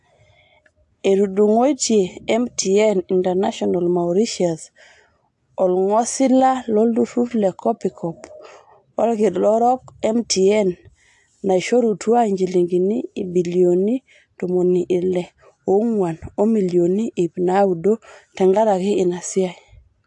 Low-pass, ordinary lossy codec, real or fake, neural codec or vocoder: 10.8 kHz; AAC, 64 kbps; real; none